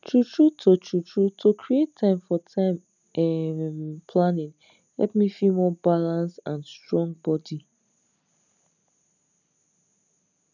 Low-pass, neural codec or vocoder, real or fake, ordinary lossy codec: 7.2 kHz; none; real; none